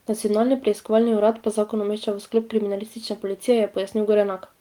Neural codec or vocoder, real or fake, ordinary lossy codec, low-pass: none; real; Opus, 24 kbps; 19.8 kHz